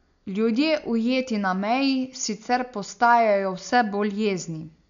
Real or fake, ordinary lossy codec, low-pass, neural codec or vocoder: real; none; 7.2 kHz; none